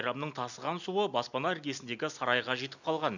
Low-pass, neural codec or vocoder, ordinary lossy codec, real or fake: 7.2 kHz; none; none; real